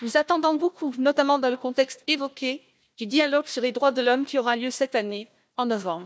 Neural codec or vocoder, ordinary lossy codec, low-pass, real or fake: codec, 16 kHz, 1 kbps, FunCodec, trained on Chinese and English, 50 frames a second; none; none; fake